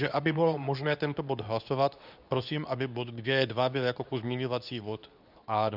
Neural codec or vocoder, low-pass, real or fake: codec, 24 kHz, 0.9 kbps, WavTokenizer, medium speech release version 2; 5.4 kHz; fake